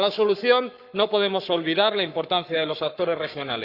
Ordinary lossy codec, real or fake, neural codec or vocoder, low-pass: Opus, 64 kbps; fake; codec, 44.1 kHz, 7.8 kbps, Pupu-Codec; 5.4 kHz